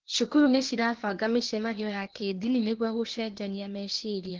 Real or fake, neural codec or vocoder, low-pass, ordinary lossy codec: fake; codec, 16 kHz, 0.8 kbps, ZipCodec; 7.2 kHz; Opus, 16 kbps